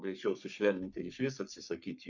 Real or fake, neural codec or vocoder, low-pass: fake; codec, 44.1 kHz, 3.4 kbps, Pupu-Codec; 7.2 kHz